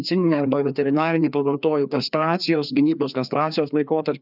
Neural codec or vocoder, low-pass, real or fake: codec, 16 kHz, 2 kbps, FreqCodec, larger model; 5.4 kHz; fake